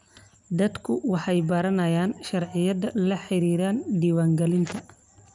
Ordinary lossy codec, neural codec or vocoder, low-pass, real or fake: none; none; 10.8 kHz; real